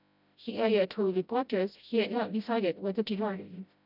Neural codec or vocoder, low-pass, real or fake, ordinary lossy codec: codec, 16 kHz, 0.5 kbps, FreqCodec, smaller model; 5.4 kHz; fake; none